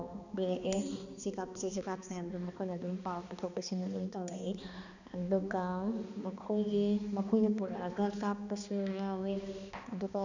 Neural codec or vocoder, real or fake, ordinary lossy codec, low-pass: codec, 16 kHz, 2 kbps, X-Codec, HuBERT features, trained on balanced general audio; fake; none; 7.2 kHz